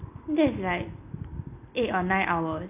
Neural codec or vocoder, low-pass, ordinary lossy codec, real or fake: none; 3.6 kHz; none; real